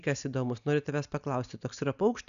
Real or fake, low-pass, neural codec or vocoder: real; 7.2 kHz; none